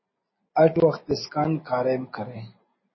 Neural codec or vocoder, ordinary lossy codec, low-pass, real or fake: none; MP3, 24 kbps; 7.2 kHz; real